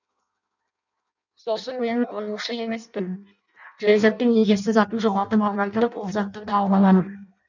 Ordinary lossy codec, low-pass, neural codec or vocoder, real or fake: none; 7.2 kHz; codec, 16 kHz in and 24 kHz out, 0.6 kbps, FireRedTTS-2 codec; fake